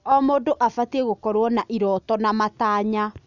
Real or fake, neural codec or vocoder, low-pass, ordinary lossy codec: real; none; 7.2 kHz; none